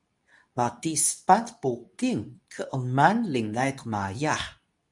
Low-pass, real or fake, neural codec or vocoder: 10.8 kHz; fake; codec, 24 kHz, 0.9 kbps, WavTokenizer, medium speech release version 2